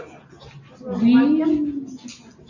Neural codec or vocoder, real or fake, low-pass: none; real; 7.2 kHz